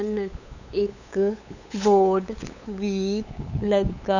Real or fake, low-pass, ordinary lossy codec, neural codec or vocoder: fake; 7.2 kHz; none; codec, 16 kHz, 8 kbps, FunCodec, trained on LibriTTS, 25 frames a second